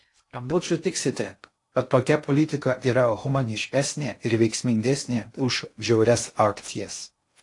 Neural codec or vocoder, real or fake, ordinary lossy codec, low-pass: codec, 16 kHz in and 24 kHz out, 0.6 kbps, FocalCodec, streaming, 2048 codes; fake; AAC, 48 kbps; 10.8 kHz